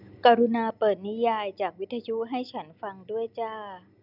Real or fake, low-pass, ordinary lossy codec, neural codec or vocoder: real; 5.4 kHz; none; none